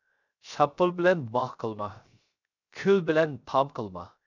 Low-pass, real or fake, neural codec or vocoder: 7.2 kHz; fake; codec, 16 kHz, 0.3 kbps, FocalCodec